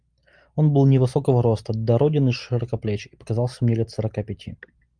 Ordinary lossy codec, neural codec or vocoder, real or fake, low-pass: Opus, 32 kbps; none; real; 9.9 kHz